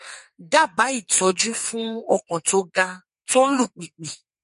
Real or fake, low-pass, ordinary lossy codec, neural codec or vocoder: fake; 14.4 kHz; MP3, 48 kbps; codec, 44.1 kHz, 2.6 kbps, SNAC